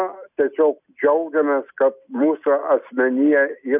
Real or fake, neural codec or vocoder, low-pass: real; none; 3.6 kHz